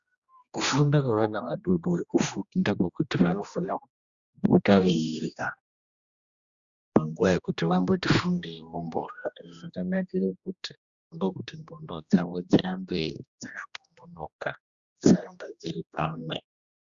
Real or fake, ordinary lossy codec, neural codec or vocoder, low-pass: fake; Opus, 64 kbps; codec, 16 kHz, 1 kbps, X-Codec, HuBERT features, trained on general audio; 7.2 kHz